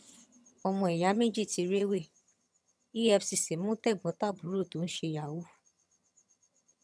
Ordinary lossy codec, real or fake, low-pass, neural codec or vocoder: none; fake; none; vocoder, 22.05 kHz, 80 mel bands, HiFi-GAN